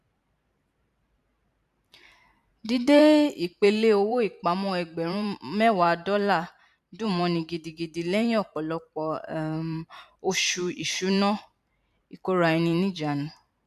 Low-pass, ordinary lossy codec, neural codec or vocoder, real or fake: 14.4 kHz; none; vocoder, 44.1 kHz, 128 mel bands every 512 samples, BigVGAN v2; fake